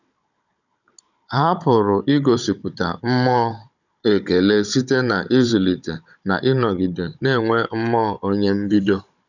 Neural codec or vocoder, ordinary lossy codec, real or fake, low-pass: codec, 16 kHz, 16 kbps, FunCodec, trained on Chinese and English, 50 frames a second; none; fake; 7.2 kHz